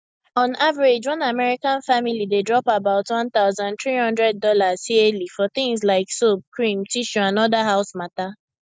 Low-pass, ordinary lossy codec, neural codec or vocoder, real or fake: none; none; none; real